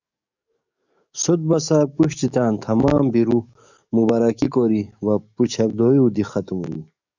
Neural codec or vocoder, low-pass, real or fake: codec, 44.1 kHz, 7.8 kbps, DAC; 7.2 kHz; fake